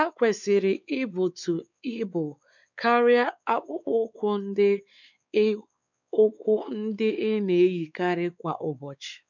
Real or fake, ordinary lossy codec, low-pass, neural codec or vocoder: fake; none; 7.2 kHz; codec, 16 kHz, 4 kbps, X-Codec, WavLM features, trained on Multilingual LibriSpeech